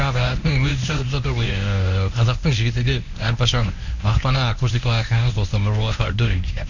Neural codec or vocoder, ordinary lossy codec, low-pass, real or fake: codec, 24 kHz, 0.9 kbps, WavTokenizer, medium speech release version 1; none; 7.2 kHz; fake